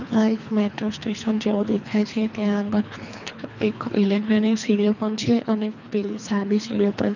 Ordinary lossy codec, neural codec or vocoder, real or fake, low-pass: none; codec, 24 kHz, 3 kbps, HILCodec; fake; 7.2 kHz